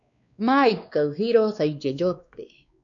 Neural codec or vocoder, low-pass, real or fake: codec, 16 kHz, 2 kbps, X-Codec, WavLM features, trained on Multilingual LibriSpeech; 7.2 kHz; fake